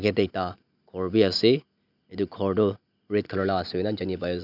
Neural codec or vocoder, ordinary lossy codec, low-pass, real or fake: none; none; 5.4 kHz; real